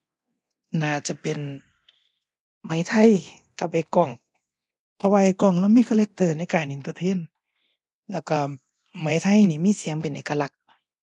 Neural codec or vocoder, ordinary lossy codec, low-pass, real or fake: codec, 24 kHz, 0.9 kbps, DualCodec; none; 10.8 kHz; fake